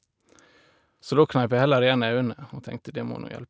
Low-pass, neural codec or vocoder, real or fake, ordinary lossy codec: none; none; real; none